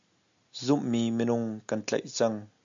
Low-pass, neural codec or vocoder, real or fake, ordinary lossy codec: 7.2 kHz; none; real; AAC, 64 kbps